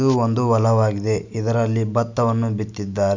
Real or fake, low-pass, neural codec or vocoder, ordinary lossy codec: real; 7.2 kHz; none; none